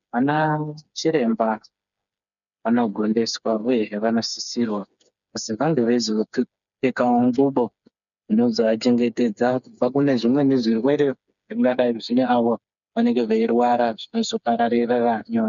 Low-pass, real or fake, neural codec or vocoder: 7.2 kHz; fake; codec, 16 kHz, 4 kbps, FreqCodec, smaller model